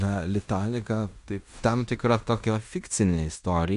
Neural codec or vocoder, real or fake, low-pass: codec, 16 kHz in and 24 kHz out, 0.9 kbps, LongCat-Audio-Codec, fine tuned four codebook decoder; fake; 10.8 kHz